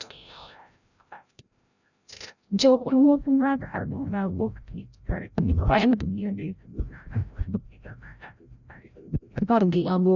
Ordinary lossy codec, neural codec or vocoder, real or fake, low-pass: none; codec, 16 kHz, 0.5 kbps, FreqCodec, larger model; fake; 7.2 kHz